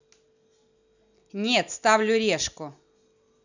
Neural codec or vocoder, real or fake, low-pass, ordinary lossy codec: none; real; 7.2 kHz; none